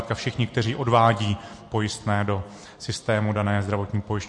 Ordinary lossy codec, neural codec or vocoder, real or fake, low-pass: MP3, 48 kbps; none; real; 10.8 kHz